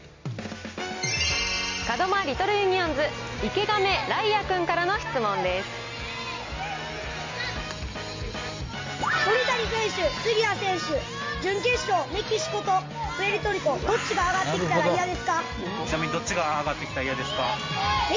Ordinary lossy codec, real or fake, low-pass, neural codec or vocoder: MP3, 48 kbps; real; 7.2 kHz; none